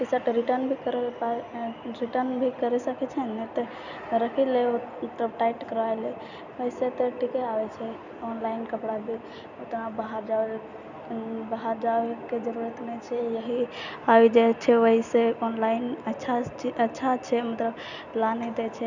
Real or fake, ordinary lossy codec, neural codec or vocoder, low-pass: real; none; none; 7.2 kHz